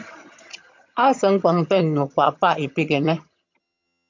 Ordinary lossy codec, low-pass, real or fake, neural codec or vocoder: MP3, 48 kbps; 7.2 kHz; fake; vocoder, 22.05 kHz, 80 mel bands, HiFi-GAN